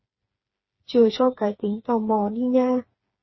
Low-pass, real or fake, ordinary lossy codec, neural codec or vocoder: 7.2 kHz; fake; MP3, 24 kbps; codec, 16 kHz, 4 kbps, FreqCodec, smaller model